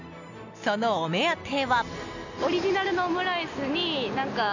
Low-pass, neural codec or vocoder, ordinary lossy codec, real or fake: 7.2 kHz; none; none; real